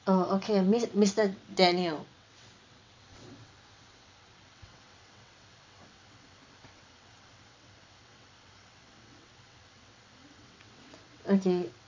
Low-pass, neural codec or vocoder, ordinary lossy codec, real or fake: 7.2 kHz; vocoder, 22.05 kHz, 80 mel bands, WaveNeXt; MP3, 64 kbps; fake